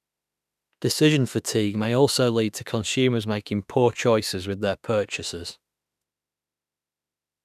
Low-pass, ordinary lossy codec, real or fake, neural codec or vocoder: 14.4 kHz; none; fake; autoencoder, 48 kHz, 32 numbers a frame, DAC-VAE, trained on Japanese speech